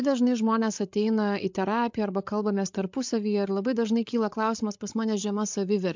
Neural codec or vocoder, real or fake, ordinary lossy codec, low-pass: codec, 16 kHz, 8 kbps, FreqCodec, larger model; fake; MP3, 64 kbps; 7.2 kHz